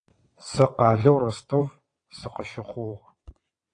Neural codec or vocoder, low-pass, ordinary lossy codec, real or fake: vocoder, 22.05 kHz, 80 mel bands, WaveNeXt; 9.9 kHz; AAC, 48 kbps; fake